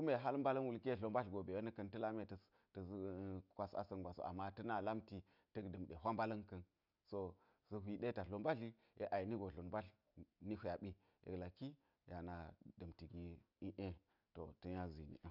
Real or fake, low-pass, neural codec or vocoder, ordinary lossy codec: real; 5.4 kHz; none; none